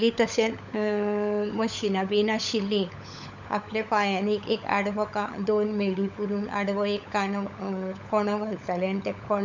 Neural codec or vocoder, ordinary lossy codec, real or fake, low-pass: codec, 16 kHz, 16 kbps, FunCodec, trained on LibriTTS, 50 frames a second; none; fake; 7.2 kHz